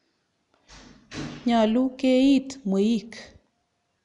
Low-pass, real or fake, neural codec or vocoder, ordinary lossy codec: 10.8 kHz; real; none; none